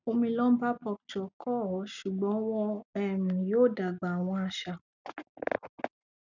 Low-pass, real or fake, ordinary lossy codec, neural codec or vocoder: 7.2 kHz; real; none; none